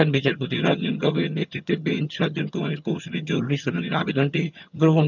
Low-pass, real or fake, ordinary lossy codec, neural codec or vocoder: 7.2 kHz; fake; none; vocoder, 22.05 kHz, 80 mel bands, HiFi-GAN